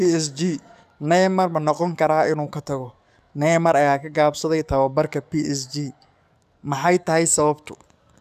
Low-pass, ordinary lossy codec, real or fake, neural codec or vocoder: 14.4 kHz; none; fake; codec, 44.1 kHz, 7.8 kbps, DAC